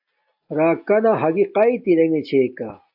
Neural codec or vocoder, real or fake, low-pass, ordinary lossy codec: none; real; 5.4 kHz; AAC, 48 kbps